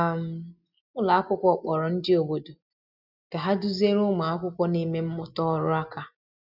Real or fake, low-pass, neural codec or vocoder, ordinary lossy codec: real; 5.4 kHz; none; none